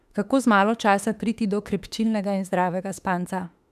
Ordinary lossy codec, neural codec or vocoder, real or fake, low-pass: none; autoencoder, 48 kHz, 32 numbers a frame, DAC-VAE, trained on Japanese speech; fake; 14.4 kHz